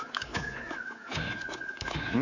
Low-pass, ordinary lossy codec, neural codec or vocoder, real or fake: 7.2 kHz; none; codec, 24 kHz, 3.1 kbps, DualCodec; fake